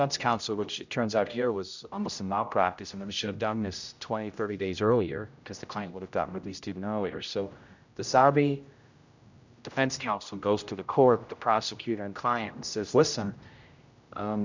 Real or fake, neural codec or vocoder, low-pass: fake; codec, 16 kHz, 0.5 kbps, X-Codec, HuBERT features, trained on general audio; 7.2 kHz